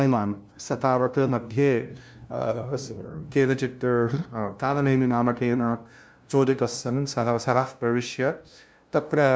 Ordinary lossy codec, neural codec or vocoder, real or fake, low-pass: none; codec, 16 kHz, 0.5 kbps, FunCodec, trained on LibriTTS, 25 frames a second; fake; none